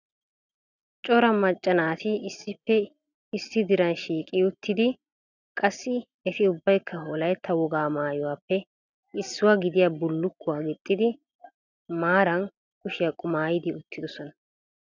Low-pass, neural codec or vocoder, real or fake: 7.2 kHz; none; real